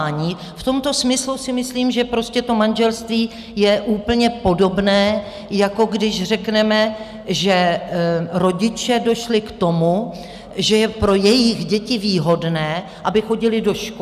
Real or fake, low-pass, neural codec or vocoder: real; 14.4 kHz; none